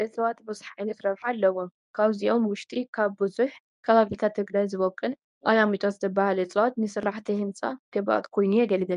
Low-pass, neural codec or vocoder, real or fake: 10.8 kHz; codec, 24 kHz, 0.9 kbps, WavTokenizer, medium speech release version 1; fake